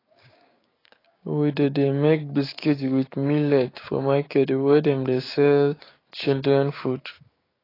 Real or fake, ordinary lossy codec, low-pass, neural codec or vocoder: fake; AAC, 24 kbps; 5.4 kHz; codec, 16 kHz, 6 kbps, DAC